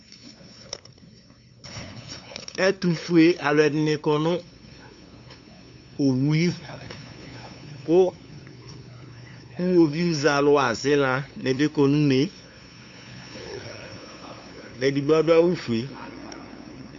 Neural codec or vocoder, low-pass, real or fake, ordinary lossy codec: codec, 16 kHz, 2 kbps, FunCodec, trained on LibriTTS, 25 frames a second; 7.2 kHz; fake; MP3, 64 kbps